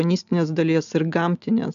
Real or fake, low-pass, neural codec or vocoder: real; 7.2 kHz; none